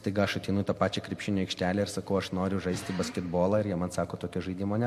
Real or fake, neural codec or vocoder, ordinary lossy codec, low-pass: real; none; MP3, 64 kbps; 14.4 kHz